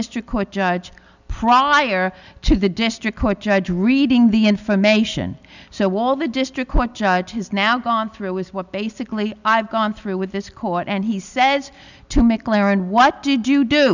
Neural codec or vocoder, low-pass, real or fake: none; 7.2 kHz; real